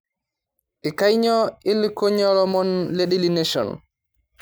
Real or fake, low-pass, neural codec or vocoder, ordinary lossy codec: real; none; none; none